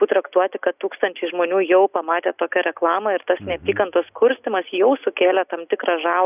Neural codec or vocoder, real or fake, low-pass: none; real; 3.6 kHz